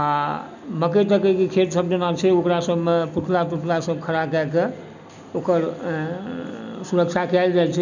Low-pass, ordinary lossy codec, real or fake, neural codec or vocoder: 7.2 kHz; none; real; none